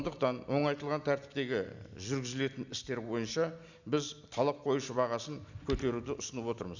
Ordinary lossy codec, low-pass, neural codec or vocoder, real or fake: none; 7.2 kHz; none; real